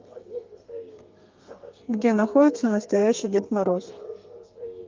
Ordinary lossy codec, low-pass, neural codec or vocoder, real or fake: Opus, 24 kbps; 7.2 kHz; codec, 32 kHz, 1.9 kbps, SNAC; fake